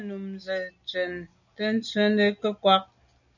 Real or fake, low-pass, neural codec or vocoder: real; 7.2 kHz; none